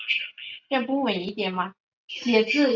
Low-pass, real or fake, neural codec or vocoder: 7.2 kHz; real; none